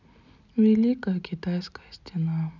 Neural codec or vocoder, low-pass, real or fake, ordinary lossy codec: none; 7.2 kHz; real; none